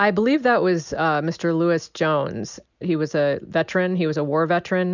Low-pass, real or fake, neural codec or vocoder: 7.2 kHz; real; none